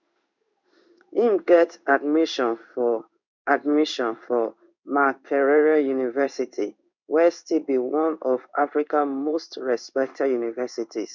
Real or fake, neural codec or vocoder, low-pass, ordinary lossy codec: fake; codec, 16 kHz in and 24 kHz out, 1 kbps, XY-Tokenizer; 7.2 kHz; none